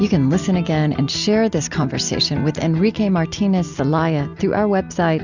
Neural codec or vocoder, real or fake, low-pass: none; real; 7.2 kHz